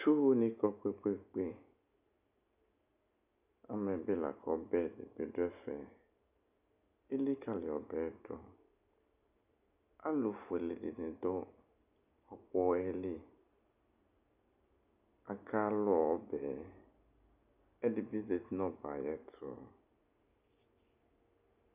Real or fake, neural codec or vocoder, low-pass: real; none; 3.6 kHz